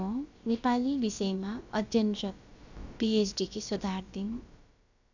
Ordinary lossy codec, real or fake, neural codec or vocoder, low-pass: none; fake; codec, 16 kHz, about 1 kbps, DyCAST, with the encoder's durations; 7.2 kHz